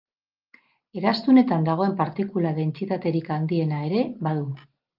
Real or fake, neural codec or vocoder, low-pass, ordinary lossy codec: real; none; 5.4 kHz; Opus, 32 kbps